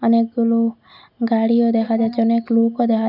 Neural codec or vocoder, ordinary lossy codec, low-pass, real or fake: none; none; 5.4 kHz; real